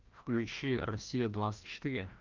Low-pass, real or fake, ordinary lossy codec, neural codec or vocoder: 7.2 kHz; fake; Opus, 24 kbps; codec, 16 kHz, 1 kbps, FreqCodec, larger model